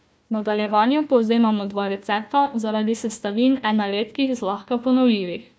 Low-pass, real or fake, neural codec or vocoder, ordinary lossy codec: none; fake; codec, 16 kHz, 1 kbps, FunCodec, trained on Chinese and English, 50 frames a second; none